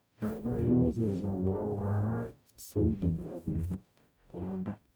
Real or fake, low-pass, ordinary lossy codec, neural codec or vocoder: fake; none; none; codec, 44.1 kHz, 0.9 kbps, DAC